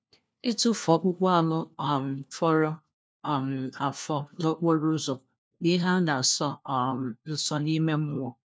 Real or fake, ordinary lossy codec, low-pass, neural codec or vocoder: fake; none; none; codec, 16 kHz, 1 kbps, FunCodec, trained on LibriTTS, 50 frames a second